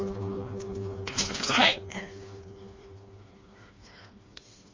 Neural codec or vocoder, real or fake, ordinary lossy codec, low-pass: codec, 16 kHz, 2 kbps, FreqCodec, smaller model; fake; MP3, 32 kbps; 7.2 kHz